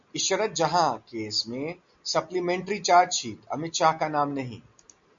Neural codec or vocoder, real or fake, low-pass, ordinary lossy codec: none; real; 7.2 kHz; MP3, 64 kbps